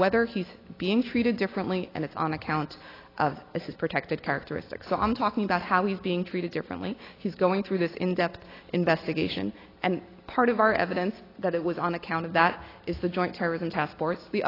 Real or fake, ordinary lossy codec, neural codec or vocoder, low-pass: real; AAC, 24 kbps; none; 5.4 kHz